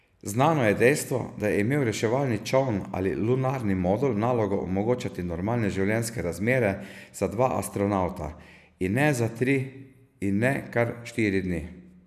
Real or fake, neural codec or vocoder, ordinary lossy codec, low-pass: real; none; none; 14.4 kHz